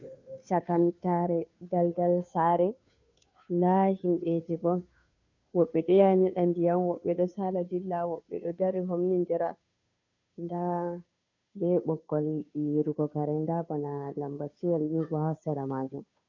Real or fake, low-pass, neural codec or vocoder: fake; 7.2 kHz; codec, 16 kHz, 2 kbps, FunCodec, trained on Chinese and English, 25 frames a second